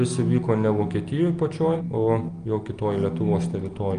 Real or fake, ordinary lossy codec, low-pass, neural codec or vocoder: real; Opus, 32 kbps; 9.9 kHz; none